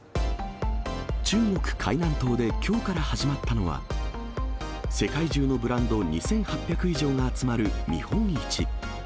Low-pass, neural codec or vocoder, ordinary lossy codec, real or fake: none; none; none; real